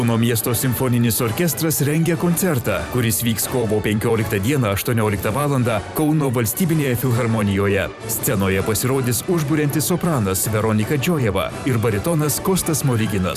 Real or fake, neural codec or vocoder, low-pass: fake; vocoder, 44.1 kHz, 128 mel bands every 512 samples, BigVGAN v2; 14.4 kHz